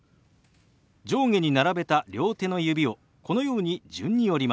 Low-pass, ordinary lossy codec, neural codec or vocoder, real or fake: none; none; none; real